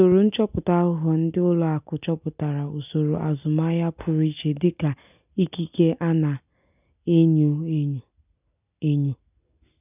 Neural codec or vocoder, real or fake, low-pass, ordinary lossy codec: none; real; 3.6 kHz; none